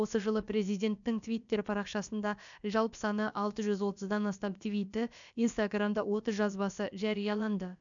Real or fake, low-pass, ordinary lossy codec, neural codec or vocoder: fake; 7.2 kHz; none; codec, 16 kHz, about 1 kbps, DyCAST, with the encoder's durations